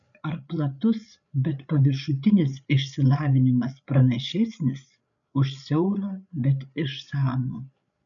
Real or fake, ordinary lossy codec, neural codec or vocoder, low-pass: fake; MP3, 96 kbps; codec, 16 kHz, 8 kbps, FreqCodec, larger model; 7.2 kHz